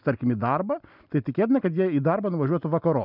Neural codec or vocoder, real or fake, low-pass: none; real; 5.4 kHz